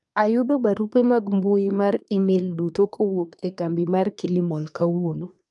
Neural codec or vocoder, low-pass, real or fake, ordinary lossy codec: codec, 24 kHz, 1 kbps, SNAC; 10.8 kHz; fake; none